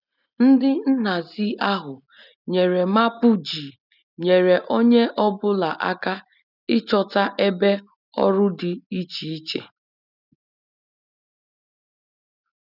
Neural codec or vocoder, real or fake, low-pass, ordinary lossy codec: none; real; 5.4 kHz; none